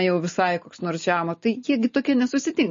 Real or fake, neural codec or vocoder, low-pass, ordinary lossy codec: real; none; 7.2 kHz; MP3, 32 kbps